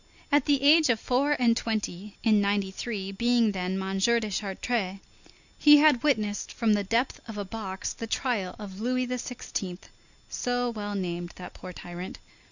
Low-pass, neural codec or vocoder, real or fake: 7.2 kHz; none; real